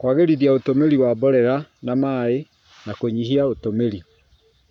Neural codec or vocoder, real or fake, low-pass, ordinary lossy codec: codec, 44.1 kHz, 7.8 kbps, Pupu-Codec; fake; 19.8 kHz; none